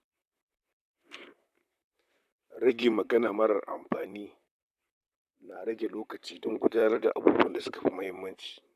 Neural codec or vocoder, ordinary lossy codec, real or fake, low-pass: vocoder, 44.1 kHz, 128 mel bands, Pupu-Vocoder; none; fake; 14.4 kHz